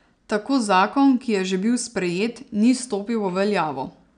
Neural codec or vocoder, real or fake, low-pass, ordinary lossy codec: none; real; 9.9 kHz; none